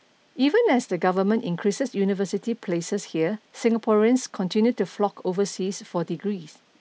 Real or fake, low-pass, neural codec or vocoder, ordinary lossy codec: real; none; none; none